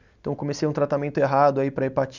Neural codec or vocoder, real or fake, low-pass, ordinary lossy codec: none; real; 7.2 kHz; none